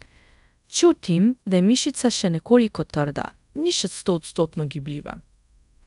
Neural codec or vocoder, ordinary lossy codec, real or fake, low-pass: codec, 24 kHz, 0.5 kbps, DualCodec; none; fake; 10.8 kHz